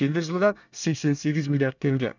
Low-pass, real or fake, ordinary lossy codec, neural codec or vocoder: 7.2 kHz; fake; none; codec, 24 kHz, 1 kbps, SNAC